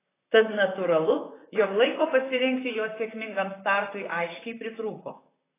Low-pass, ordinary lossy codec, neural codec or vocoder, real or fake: 3.6 kHz; AAC, 16 kbps; vocoder, 44.1 kHz, 128 mel bands, Pupu-Vocoder; fake